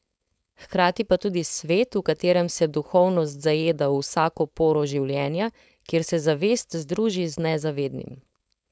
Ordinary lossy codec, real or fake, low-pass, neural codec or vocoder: none; fake; none; codec, 16 kHz, 4.8 kbps, FACodec